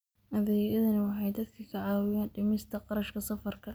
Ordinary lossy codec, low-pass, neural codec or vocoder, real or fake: none; none; none; real